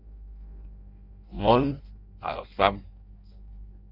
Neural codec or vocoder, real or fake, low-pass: codec, 16 kHz in and 24 kHz out, 0.6 kbps, FireRedTTS-2 codec; fake; 5.4 kHz